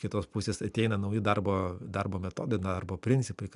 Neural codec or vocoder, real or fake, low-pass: none; real; 10.8 kHz